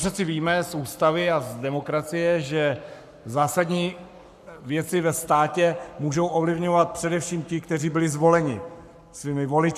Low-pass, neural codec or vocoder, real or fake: 14.4 kHz; codec, 44.1 kHz, 7.8 kbps, Pupu-Codec; fake